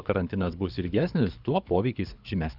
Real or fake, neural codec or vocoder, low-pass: fake; codec, 24 kHz, 6 kbps, HILCodec; 5.4 kHz